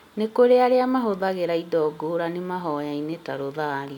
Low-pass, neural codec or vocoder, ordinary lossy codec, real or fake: 19.8 kHz; none; none; real